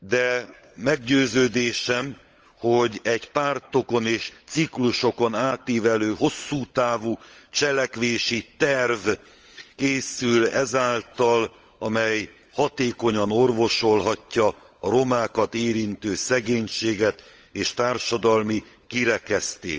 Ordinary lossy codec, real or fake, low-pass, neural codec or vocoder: Opus, 24 kbps; fake; 7.2 kHz; codec, 16 kHz, 16 kbps, FunCodec, trained on LibriTTS, 50 frames a second